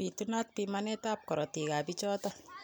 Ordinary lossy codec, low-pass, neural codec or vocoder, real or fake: none; none; none; real